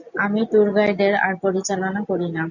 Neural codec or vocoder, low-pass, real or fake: none; 7.2 kHz; real